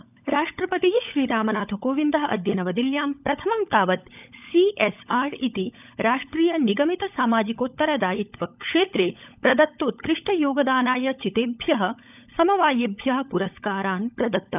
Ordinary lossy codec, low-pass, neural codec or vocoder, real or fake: none; 3.6 kHz; codec, 16 kHz, 16 kbps, FunCodec, trained on LibriTTS, 50 frames a second; fake